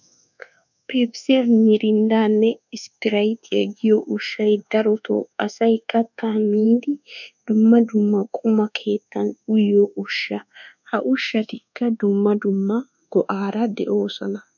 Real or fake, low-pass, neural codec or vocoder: fake; 7.2 kHz; codec, 24 kHz, 1.2 kbps, DualCodec